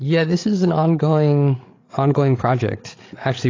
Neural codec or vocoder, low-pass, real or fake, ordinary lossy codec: codec, 16 kHz, 8 kbps, FreqCodec, larger model; 7.2 kHz; fake; AAC, 32 kbps